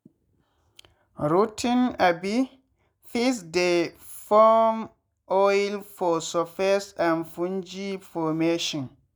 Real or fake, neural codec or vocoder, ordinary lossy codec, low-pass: real; none; none; none